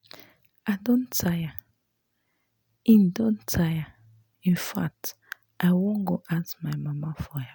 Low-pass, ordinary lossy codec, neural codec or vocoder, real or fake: none; none; none; real